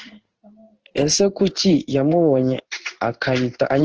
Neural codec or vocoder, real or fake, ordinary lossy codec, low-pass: codec, 16 kHz in and 24 kHz out, 1 kbps, XY-Tokenizer; fake; Opus, 16 kbps; 7.2 kHz